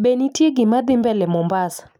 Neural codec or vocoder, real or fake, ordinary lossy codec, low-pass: none; real; none; 19.8 kHz